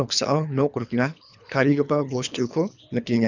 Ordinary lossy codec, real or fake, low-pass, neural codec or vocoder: none; fake; 7.2 kHz; codec, 24 kHz, 3 kbps, HILCodec